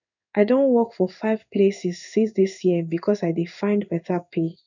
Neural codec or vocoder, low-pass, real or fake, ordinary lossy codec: codec, 16 kHz in and 24 kHz out, 1 kbps, XY-Tokenizer; 7.2 kHz; fake; none